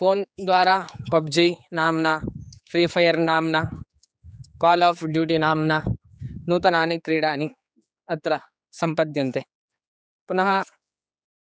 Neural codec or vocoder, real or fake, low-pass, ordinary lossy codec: codec, 16 kHz, 4 kbps, X-Codec, HuBERT features, trained on general audio; fake; none; none